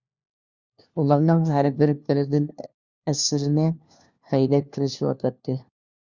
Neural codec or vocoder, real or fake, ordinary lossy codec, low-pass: codec, 16 kHz, 1 kbps, FunCodec, trained on LibriTTS, 50 frames a second; fake; Opus, 64 kbps; 7.2 kHz